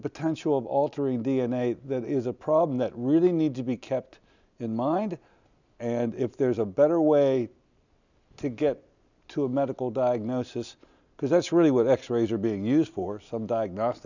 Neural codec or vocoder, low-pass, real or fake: none; 7.2 kHz; real